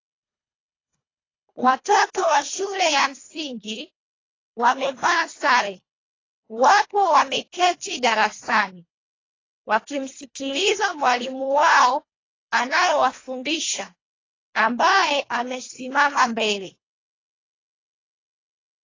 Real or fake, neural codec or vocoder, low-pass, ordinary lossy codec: fake; codec, 24 kHz, 1.5 kbps, HILCodec; 7.2 kHz; AAC, 32 kbps